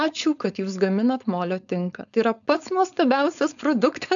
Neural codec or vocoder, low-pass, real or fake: codec, 16 kHz, 4.8 kbps, FACodec; 7.2 kHz; fake